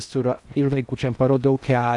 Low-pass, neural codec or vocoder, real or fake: 10.8 kHz; codec, 16 kHz in and 24 kHz out, 0.8 kbps, FocalCodec, streaming, 65536 codes; fake